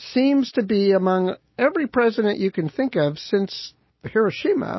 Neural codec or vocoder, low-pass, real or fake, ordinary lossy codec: none; 7.2 kHz; real; MP3, 24 kbps